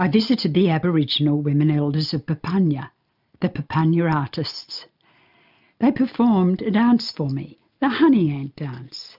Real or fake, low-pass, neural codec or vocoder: real; 5.4 kHz; none